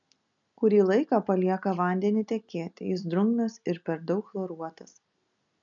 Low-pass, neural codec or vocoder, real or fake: 7.2 kHz; none; real